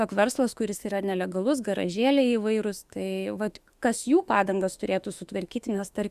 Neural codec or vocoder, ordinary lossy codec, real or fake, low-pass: autoencoder, 48 kHz, 32 numbers a frame, DAC-VAE, trained on Japanese speech; Opus, 64 kbps; fake; 14.4 kHz